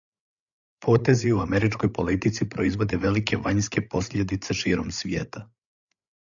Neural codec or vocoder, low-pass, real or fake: codec, 16 kHz, 8 kbps, FreqCodec, larger model; 7.2 kHz; fake